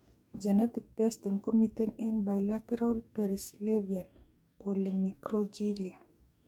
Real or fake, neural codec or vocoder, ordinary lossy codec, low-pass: fake; codec, 44.1 kHz, 2.6 kbps, DAC; MP3, 96 kbps; 19.8 kHz